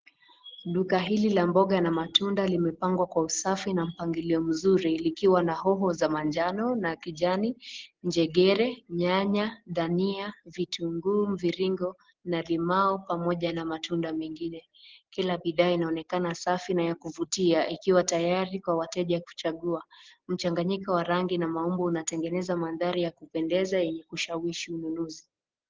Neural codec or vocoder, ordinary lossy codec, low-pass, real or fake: none; Opus, 16 kbps; 7.2 kHz; real